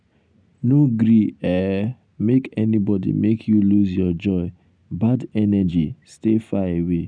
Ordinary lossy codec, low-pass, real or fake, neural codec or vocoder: none; 9.9 kHz; real; none